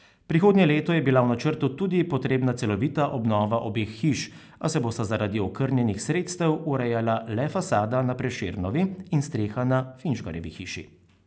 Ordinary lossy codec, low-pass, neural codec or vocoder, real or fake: none; none; none; real